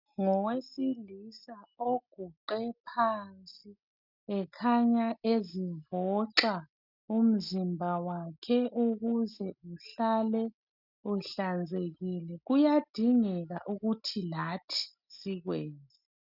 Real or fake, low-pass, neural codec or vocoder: real; 5.4 kHz; none